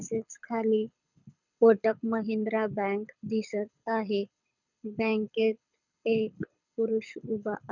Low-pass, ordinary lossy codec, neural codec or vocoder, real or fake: 7.2 kHz; none; codec, 24 kHz, 6 kbps, HILCodec; fake